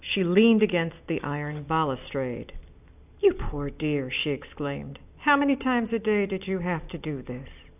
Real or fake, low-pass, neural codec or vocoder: real; 3.6 kHz; none